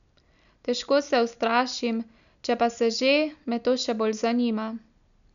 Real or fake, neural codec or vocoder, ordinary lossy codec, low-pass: real; none; none; 7.2 kHz